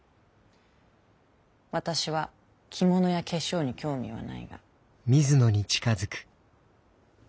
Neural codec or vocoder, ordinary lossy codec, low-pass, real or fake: none; none; none; real